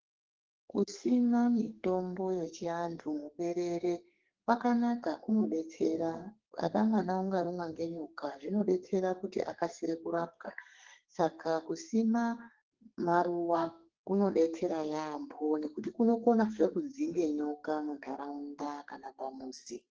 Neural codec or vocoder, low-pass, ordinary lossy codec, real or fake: codec, 32 kHz, 1.9 kbps, SNAC; 7.2 kHz; Opus, 16 kbps; fake